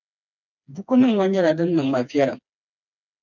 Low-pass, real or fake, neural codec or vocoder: 7.2 kHz; fake; codec, 16 kHz, 2 kbps, FreqCodec, smaller model